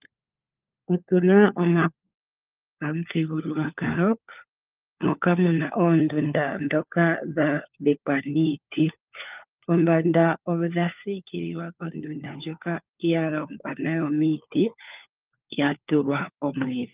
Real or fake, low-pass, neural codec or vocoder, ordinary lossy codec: fake; 3.6 kHz; codec, 16 kHz, 4 kbps, FunCodec, trained on LibriTTS, 50 frames a second; Opus, 24 kbps